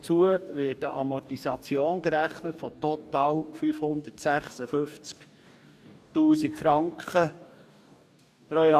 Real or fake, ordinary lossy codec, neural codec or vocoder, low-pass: fake; none; codec, 44.1 kHz, 2.6 kbps, DAC; 14.4 kHz